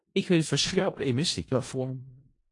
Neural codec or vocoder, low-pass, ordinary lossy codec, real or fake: codec, 16 kHz in and 24 kHz out, 0.4 kbps, LongCat-Audio-Codec, four codebook decoder; 10.8 kHz; AAC, 48 kbps; fake